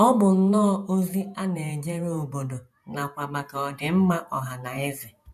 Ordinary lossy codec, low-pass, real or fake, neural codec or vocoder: none; 14.4 kHz; fake; vocoder, 48 kHz, 128 mel bands, Vocos